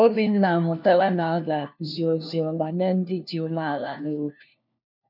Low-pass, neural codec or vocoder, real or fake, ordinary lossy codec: 5.4 kHz; codec, 16 kHz, 1 kbps, FunCodec, trained on LibriTTS, 50 frames a second; fake; none